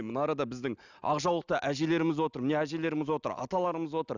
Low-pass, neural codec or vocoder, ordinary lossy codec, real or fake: 7.2 kHz; none; none; real